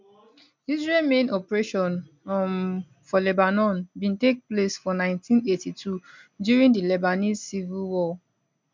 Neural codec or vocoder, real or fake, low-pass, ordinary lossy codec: none; real; 7.2 kHz; MP3, 64 kbps